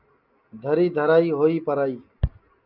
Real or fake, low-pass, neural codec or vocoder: real; 5.4 kHz; none